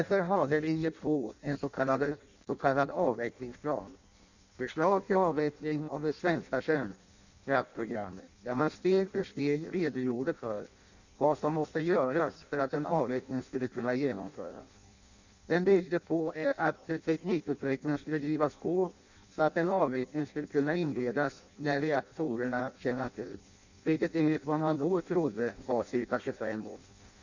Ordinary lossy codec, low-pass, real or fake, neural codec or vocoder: none; 7.2 kHz; fake; codec, 16 kHz in and 24 kHz out, 0.6 kbps, FireRedTTS-2 codec